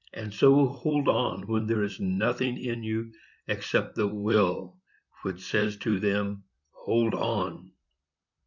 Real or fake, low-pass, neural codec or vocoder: fake; 7.2 kHz; vocoder, 44.1 kHz, 128 mel bands, Pupu-Vocoder